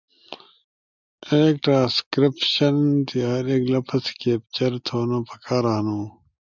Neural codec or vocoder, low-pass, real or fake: none; 7.2 kHz; real